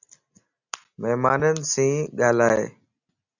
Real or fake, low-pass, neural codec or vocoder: real; 7.2 kHz; none